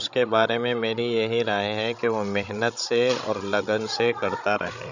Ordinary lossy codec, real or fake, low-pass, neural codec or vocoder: none; fake; 7.2 kHz; codec, 16 kHz, 16 kbps, FreqCodec, larger model